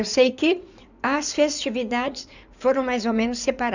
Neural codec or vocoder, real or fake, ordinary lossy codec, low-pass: codec, 16 kHz in and 24 kHz out, 2.2 kbps, FireRedTTS-2 codec; fake; none; 7.2 kHz